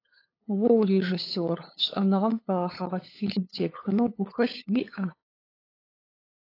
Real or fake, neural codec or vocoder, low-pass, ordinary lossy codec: fake; codec, 16 kHz, 8 kbps, FunCodec, trained on LibriTTS, 25 frames a second; 5.4 kHz; AAC, 32 kbps